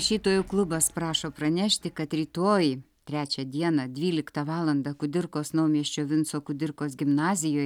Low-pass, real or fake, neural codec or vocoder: 19.8 kHz; real; none